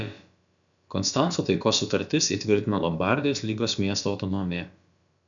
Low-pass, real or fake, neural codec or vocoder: 7.2 kHz; fake; codec, 16 kHz, about 1 kbps, DyCAST, with the encoder's durations